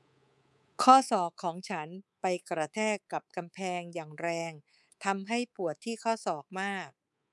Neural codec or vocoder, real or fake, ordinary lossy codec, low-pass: codec, 24 kHz, 3.1 kbps, DualCodec; fake; none; none